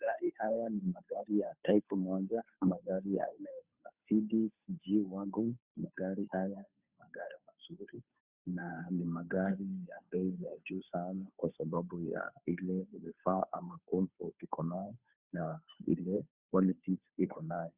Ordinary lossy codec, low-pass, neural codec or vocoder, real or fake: Opus, 64 kbps; 3.6 kHz; codec, 16 kHz, 2 kbps, FunCodec, trained on Chinese and English, 25 frames a second; fake